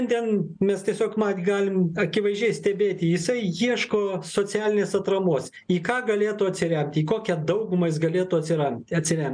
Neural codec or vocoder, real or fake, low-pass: none; real; 9.9 kHz